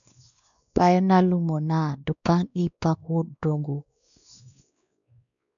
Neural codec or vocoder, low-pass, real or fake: codec, 16 kHz, 2 kbps, X-Codec, WavLM features, trained on Multilingual LibriSpeech; 7.2 kHz; fake